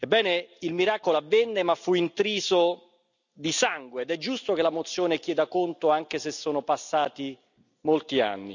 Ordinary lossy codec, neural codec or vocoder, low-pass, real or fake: none; none; 7.2 kHz; real